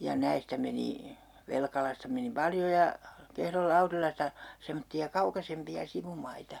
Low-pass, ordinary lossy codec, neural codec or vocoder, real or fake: 19.8 kHz; none; none; real